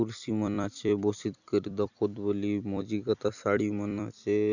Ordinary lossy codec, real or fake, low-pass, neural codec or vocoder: none; fake; 7.2 kHz; vocoder, 44.1 kHz, 128 mel bands every 256 samples, BigVGAN v2